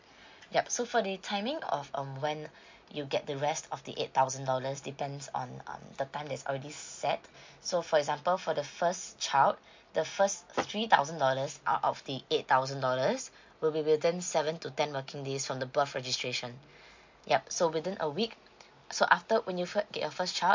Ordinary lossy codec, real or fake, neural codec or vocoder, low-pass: MP3, 48 kbps; real; none; 7.2 kHz